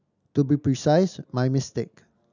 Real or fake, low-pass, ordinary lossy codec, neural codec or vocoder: real; 7.2 kHz; none; none